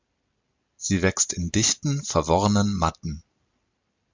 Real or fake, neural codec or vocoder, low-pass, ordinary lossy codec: real; none; 7.2 kHz; MP3, 64 kbps